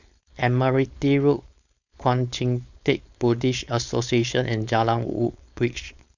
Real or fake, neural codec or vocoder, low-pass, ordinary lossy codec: fake; codec, 16 kHz, 4.8 kbps, FACodec; 7.2 kHz; none